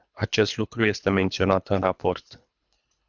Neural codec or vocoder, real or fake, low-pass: codec, 24 kHz, 3 kbps, HILCodec; fake; 7.2 kHz